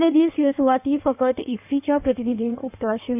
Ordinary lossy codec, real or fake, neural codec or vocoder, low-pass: none; fake; codec, 16 kHz in and 24 kHz out, 1.1 kbps, FireRedTTS-2 codec; 3.6 kHz